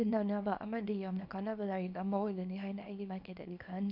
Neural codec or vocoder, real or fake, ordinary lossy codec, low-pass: codec, 24 kHz, 0.9 kbps, WavTokenizer, small release; fake; none; 5.4 kHz